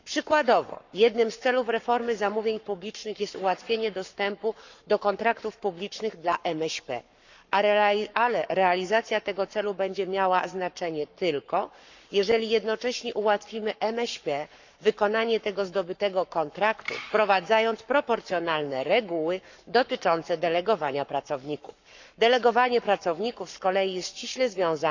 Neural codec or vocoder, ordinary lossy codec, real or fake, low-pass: codec, 44.1 kHz, 7.8 kbps, Pupu-Codec; none; fake; 7.2 kHz